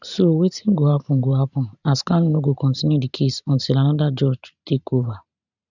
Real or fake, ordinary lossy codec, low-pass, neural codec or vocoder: fake; none; 7.2 kHz; vocoder, 44.1 kHz, 128 mel bands every 512 samples, BigVGAN v2